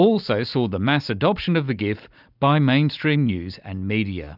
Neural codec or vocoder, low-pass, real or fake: none; 5.4 kHz; real